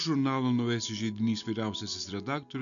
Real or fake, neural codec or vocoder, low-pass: real; none; 7.2 kHz